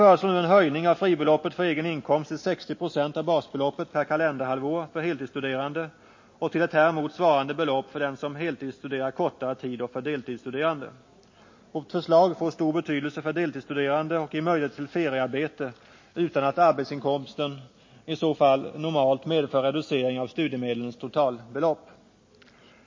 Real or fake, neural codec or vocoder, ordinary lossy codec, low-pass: real; none; MP3, 32 kbps; 7.2 kHz